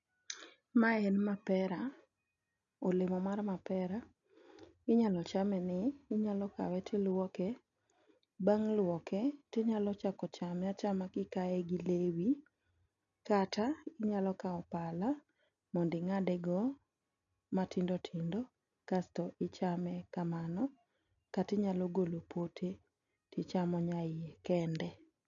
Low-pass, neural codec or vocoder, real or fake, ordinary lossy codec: 7.2 kHz; none; real; none